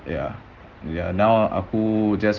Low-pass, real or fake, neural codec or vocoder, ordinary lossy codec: 7.2 kHz; real; none; Opus, 16 kbps